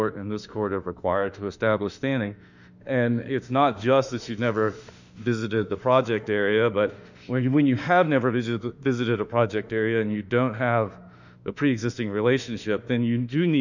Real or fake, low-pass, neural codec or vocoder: fake; 7.2 kHz; autoencoder, 48 kHz, 32 numbers a frame, DAC-VAE, trained on Japanese speech